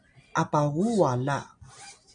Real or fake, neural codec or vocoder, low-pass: real; none; 9.9 kHz